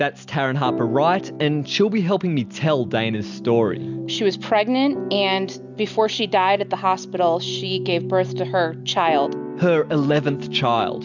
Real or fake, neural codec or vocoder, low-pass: real; none; 7.2 kHz